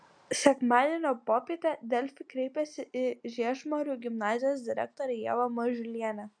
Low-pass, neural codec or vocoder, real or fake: 9.9 kHz; none; real